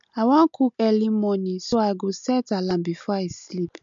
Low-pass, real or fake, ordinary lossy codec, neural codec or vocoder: 7.2 kHz; real; MP3, 64 kbps; none